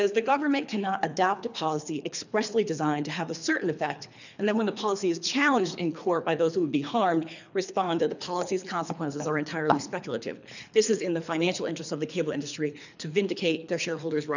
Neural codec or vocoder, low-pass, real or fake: codec, 24 kHz, 3 kbps, HILCodec; 7.2 kHz; fake